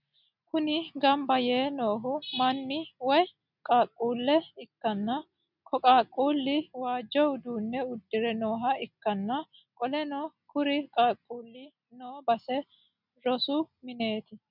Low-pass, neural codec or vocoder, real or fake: 5.4 kHz; none; real